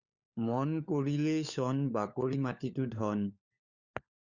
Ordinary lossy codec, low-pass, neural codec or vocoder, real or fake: Opus, 64 kbps; 7.2 kHz; codec, 16 kHz, 4 kbps, FunCodec, trained on LibriTTS, 50 frames a second; fake